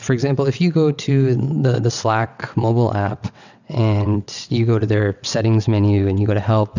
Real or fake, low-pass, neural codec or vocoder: fake; 7.2 kHz; vocoder, 22.05 kHz, 80 mel bands, WaveNeXt